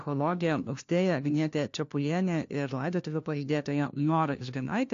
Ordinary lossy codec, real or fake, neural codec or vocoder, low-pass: AAC, 48 kbps; fake; codec, 16 kHz, 1 kbps, FunCodec, trained on LibriTTS, 50 frames a second; 7.2 kHz